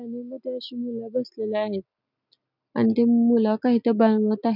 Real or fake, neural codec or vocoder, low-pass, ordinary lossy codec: real; none; 5.4 kHz; none